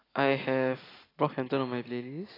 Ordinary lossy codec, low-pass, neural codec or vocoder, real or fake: AAC, 24 kbps; 5.4 kHz; none; real